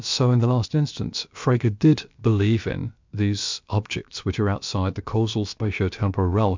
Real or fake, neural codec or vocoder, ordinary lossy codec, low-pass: fake; codec, 16 kHz, about 1 kbps, DyCAST, with the encoder's durations; MP3, 64 kbps; 7.2 kHz